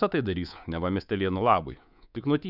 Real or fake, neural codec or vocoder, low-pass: fake; codec, 24 kHz, 3.1 kbps, DualCodec; 5.4 kHz